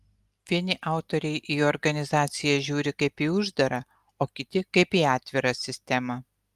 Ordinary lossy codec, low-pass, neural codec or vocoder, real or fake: Opus, 32 kbps; 14.4 kHz; none; real